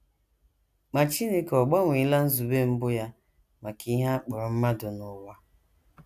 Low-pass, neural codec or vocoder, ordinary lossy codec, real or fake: 14.4 kHz; none; none; real